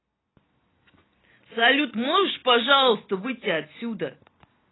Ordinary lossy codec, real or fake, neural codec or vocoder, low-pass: AAC, 16 kbps; real; none; 7.2 kHz